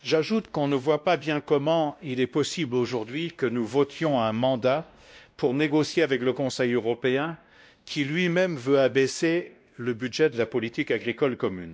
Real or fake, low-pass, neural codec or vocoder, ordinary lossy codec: fake; none; codec, 16 kHz, 1 kbps, X-Codec, WavLM features, trained on Multilingual LibriSpeech; none